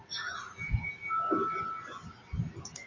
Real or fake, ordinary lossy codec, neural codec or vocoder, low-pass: real; MP3, 48 kbps; none; 7.2 kHz